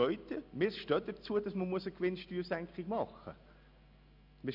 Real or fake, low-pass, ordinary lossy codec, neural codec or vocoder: real; 5.4 kHz; none; none